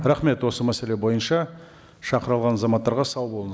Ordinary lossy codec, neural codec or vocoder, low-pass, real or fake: none; none; none; real